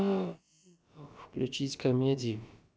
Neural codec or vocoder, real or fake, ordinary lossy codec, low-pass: codec, 16 kHz, about 1 kbps, DyCAST, with the encoder's durations; fake; none; none